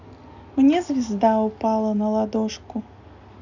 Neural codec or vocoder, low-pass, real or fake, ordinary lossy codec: none; 7.2 kHz; real; none